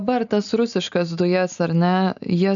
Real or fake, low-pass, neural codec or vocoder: real; 7.2 kHz; none